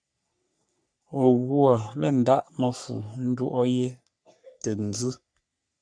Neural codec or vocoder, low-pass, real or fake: codec, 44.1 kHz, 3.4 kbps, Pupu-Codec; 9.9 kHz; fake